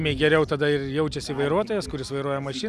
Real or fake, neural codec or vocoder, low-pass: real; none; 14.4 kHz